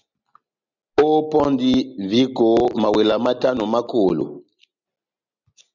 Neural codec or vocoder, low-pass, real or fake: none; 7.2 kHz; real